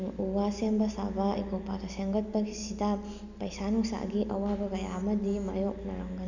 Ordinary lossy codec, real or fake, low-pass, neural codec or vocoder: none; real; 7.2 kHz; none